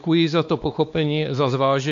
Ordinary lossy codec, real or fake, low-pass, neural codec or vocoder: AAC, 64 kbps; fake; 7.2 kHz; codec, 16 kHz, 2 kbps, X-Codec, WavLM features, trained on Multilingual LibriSpeech